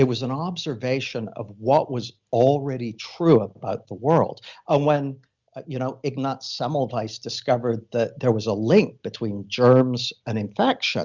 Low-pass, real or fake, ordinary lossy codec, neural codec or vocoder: 7.2 kHz; real; Opus, 64 kbps; none